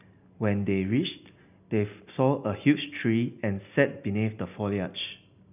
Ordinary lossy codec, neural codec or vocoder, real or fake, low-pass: none; none; real; 3.6 kHz